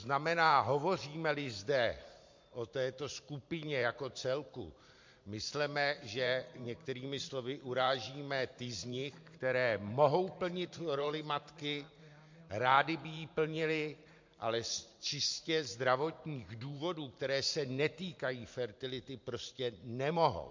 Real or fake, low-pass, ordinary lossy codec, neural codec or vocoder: fake; 7.2 kHz; MP3, 48 kbps; vocoder, 44.1 kHz, 128 mel bands every 512 samples, BigVGAN v2